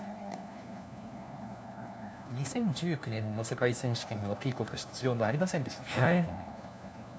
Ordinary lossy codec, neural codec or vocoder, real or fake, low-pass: none; codec, 16 kHz, 1 kbps, FunCodec, trained on LibriTTS, 50 frames a second; fake; none